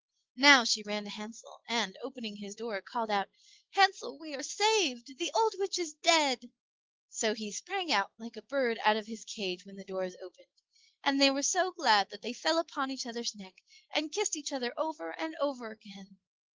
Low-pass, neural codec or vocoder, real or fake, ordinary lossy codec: 7.2 kHz; autoencoder, 48 kHz, 128 numbers a frame, DAC-VAE, trained on Japanese speech; fake; Opus, 16 kbps